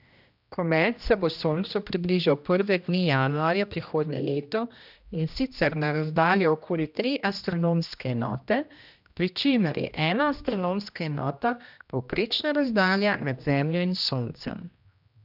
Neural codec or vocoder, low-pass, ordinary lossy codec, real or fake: codec, 16 kHz, 1 kbps, X-Codec, HuBERT features, trained on general audio; 5.4 kHz; none; fake